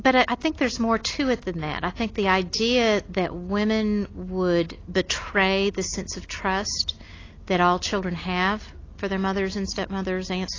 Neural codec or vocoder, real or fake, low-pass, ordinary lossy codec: none; real; 7.2 kHz; AAC, 32 kbps